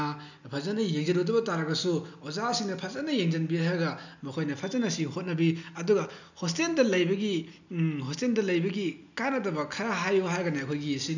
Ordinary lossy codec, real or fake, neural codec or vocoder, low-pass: none; real; none; 7.2 kHz